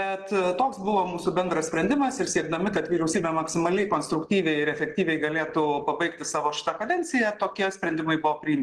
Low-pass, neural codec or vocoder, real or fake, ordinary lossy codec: 10.8 kHz; none; real; Opus, 16 kbps